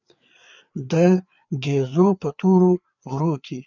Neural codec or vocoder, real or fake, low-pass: codec, 16 kHz, 4 kbps, FreqCodec, larger model; fake; 7.2 kHz